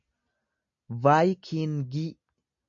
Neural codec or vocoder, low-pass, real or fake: none; 7.2 kHz; real